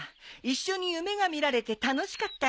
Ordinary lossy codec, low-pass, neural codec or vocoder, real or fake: none; none; none; real